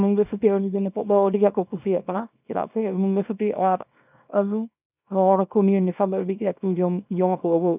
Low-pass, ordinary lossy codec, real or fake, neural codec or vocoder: 3.6 kHz; none; fake; codec, 24 kHz, 0.9 kbps, WavTokenizer, small release